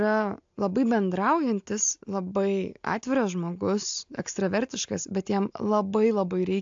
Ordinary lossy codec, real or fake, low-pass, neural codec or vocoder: AAC, 64 kbps; real; 7.2 kHz; none